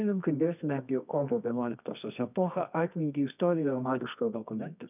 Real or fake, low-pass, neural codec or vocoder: fake; 3.6 kHz; codec, 24 kHz, 0.9 kbps, WavTokenizer, medium music audio release